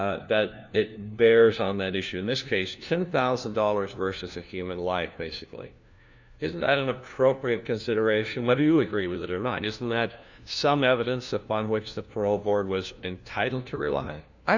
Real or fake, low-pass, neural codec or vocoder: fake; 7.2 kHz; codec, 16 kHz, 1 kbps, FunCodec, trained on LibriTTS, 50 frames a second